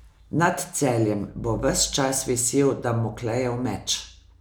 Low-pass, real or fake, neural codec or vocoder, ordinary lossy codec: none; real; none; none